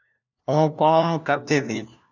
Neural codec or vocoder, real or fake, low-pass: codec, 16 kHz, 1 kbps, FunCodec, trained on LibriTTS, 50 frames a second; fake; 7.2 kHz